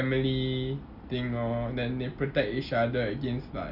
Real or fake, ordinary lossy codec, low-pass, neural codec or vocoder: real; Opus, 64 kbps; 5.4 kHz; none